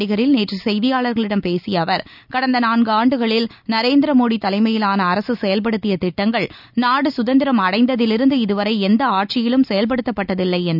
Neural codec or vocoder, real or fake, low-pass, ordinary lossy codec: none; real; 5.4 kHz; none